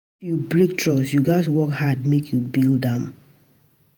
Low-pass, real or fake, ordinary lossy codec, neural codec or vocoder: none; fake; none; vocoder, 48 kHz, 128 mel bands, Vocos